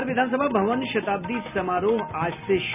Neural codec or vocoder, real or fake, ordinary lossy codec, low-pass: none; real; none; 3.6 kHz